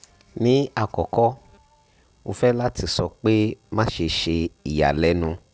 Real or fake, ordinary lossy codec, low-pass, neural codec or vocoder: real; none; none; none